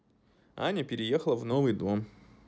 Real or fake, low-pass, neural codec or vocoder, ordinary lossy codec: real; none; none; none